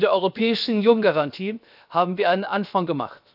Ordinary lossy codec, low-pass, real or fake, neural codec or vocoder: none; 5.4 kHz; fake; codec, 16 kHz, 0.7 kbps, FocalCodec